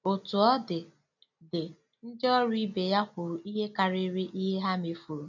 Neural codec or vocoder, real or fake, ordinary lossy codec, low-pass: none; real; none; 7.2 kHz